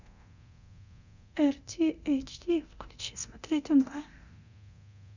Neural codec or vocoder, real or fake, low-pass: codec, 24 kHz, 0.9 kbps, DualCodec; fake; 7.2 kHz